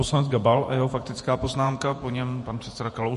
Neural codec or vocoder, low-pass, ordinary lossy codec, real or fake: vocoder, 48 kHz, 128 mel bands, Vocos; 14.4 kHz; MP3, 48 kbps; fake